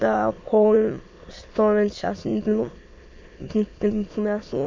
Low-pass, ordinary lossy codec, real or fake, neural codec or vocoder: 7.2 kHz; MP3, 48 kbps; fake; autoencoder, 22.05 kHz, a latent of 192 numbers a frame, VITS, trained on many speakers